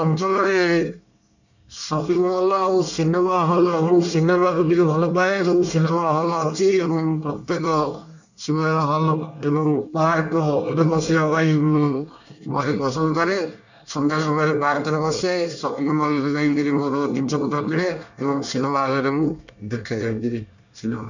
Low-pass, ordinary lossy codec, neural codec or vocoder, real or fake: 7.2 kHz; none; codec, 24 kHz, 1 kbps, SNAC; fake